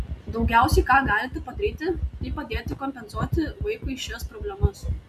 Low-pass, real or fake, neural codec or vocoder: 14.4 kHz; real; none